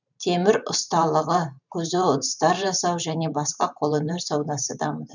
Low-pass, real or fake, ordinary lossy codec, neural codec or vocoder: 7.2 kHz; fake; none; vocoder, 44.1 kHz, 128 mel bands every 512 samples, BigVGAN v2